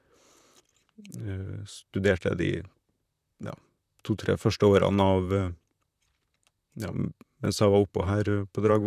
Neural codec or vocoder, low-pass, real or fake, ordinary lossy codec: vocoder, 44.1 kHz, 128 mel bands, Pupu-Vocoder; 14.4 kHz; fake; none